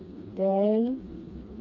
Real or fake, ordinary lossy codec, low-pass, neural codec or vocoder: fake; AAC, 48 kbps; 7.2 kHz; codec, 16 kHz, 2 kbps, FreqCodec, smaller model